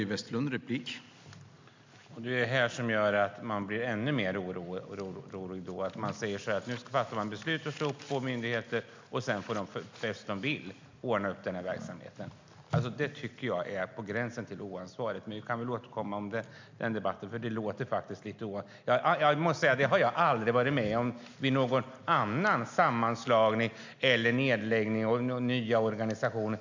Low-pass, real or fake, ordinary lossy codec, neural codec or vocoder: 7.2 kHz; real; MP3, 64 kbps; none